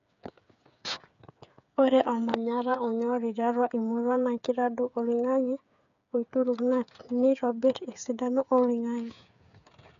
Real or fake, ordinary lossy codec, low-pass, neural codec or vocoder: fake; none; 7.2 kHz; codec, 16 kHz, 8 kbps, FreqCodec, smaller model